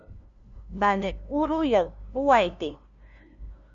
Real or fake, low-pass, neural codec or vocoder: fake; 7.2 kHz; codec, 16 kHz, 0.5 kbps, FunCodec, trained on LibriTTS, 25 frames a second